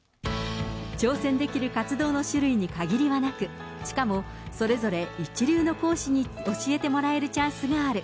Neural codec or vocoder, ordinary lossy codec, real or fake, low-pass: none; none; real; none